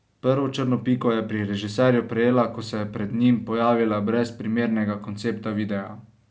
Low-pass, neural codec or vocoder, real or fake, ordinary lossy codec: none; none; real; none